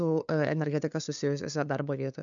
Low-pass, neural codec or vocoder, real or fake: 7.2 kHz; codec, 16 kHz, 2 kbps, FunCodec, trained on LibriTTS, 25 frames a second; fake